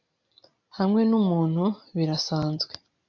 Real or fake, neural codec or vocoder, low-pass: real; none; 7.2 kHz